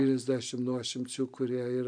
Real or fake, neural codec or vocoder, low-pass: real; none; 9.9 kHz